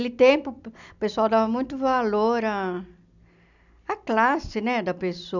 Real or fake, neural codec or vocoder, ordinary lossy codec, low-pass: real; none; none; 7.2 kHz